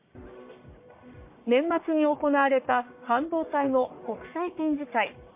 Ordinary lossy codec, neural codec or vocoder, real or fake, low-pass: MP3, 24 kbps; codec, 44.1 kHz, 1.7 kbps, Pupu-Codec; fake; 3.6 kHz